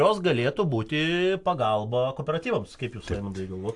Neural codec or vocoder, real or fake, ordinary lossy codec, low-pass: none; real; AAC, 64 kbps; 10.8 kHz